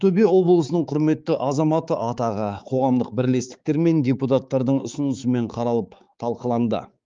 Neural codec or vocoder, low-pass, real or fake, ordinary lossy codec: codec, 16 kHz, 4 kbps, X-Codec, HuBERT features, trained on balanced general audio; 7.2 kHz; fake; Opus, 32 kbps